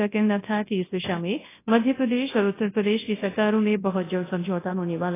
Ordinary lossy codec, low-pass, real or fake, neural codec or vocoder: AAC, 16 kbps; 3.6 kHz; fake; codec, 24 kHz, 0.9 kbps, WavTokenizer, large speech release